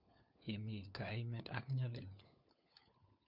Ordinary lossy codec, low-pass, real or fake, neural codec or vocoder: none; 5.4 kHz; fake; codec, 16 kHz, 4 kbps, FunCodec, trained on LibriTTS, 50 frames a second